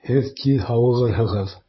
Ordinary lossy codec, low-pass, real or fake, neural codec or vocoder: MP3, 24 kbps; 7.2 kHz; fake; vocoder, 44.1 kHz, 128 mel bands, Pupu-Vocoder